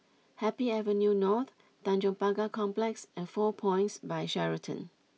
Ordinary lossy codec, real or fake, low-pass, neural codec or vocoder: none; real; none; none